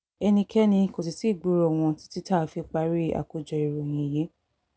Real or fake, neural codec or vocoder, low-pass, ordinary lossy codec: real; none; none; none